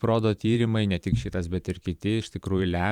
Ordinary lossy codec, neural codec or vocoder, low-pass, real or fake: Opus, 64 kbps; vocoder, 44.1 kHz, 128 mel bands every 512 samples, BigVGAN v2; 19.8 kHz; fake